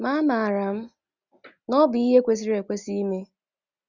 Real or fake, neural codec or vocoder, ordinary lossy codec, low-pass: real; none; Opus, 64 kbps; 7.2 kHz